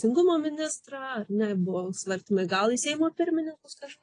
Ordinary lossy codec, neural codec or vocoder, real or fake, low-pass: AAC, 32 kbps; none; real; 9.9 kHz